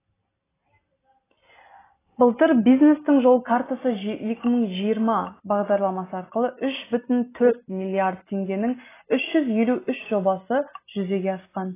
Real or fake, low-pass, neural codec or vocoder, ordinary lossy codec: real; 3.6 kHz; none; AAC, 16 kbps